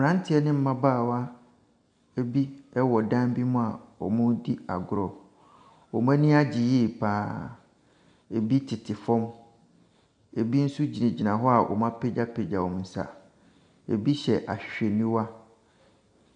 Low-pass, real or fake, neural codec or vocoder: 9.9 kHz; real; none